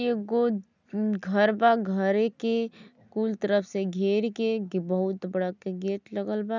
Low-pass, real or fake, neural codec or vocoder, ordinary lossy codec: 7.2 kHz; real; none; none